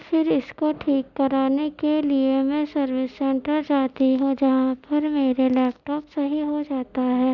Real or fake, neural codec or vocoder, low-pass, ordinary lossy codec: real; none; 7.2 kHz; none